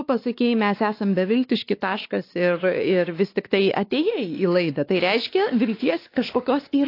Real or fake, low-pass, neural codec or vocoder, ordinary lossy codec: fake; 5.4 kHz; codec, 16 kHz, 2 kbps, X-Codec, WavLM features, trained on Multilingual LibriSpeech; AAC, 32 kbps